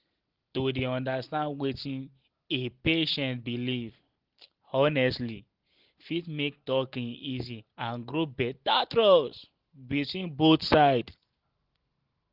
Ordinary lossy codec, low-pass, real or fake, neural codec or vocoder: Opus, 16 kbps; 5.4 kHz; real; none